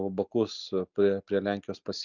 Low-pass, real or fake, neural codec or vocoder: 7.2 kHz; real; none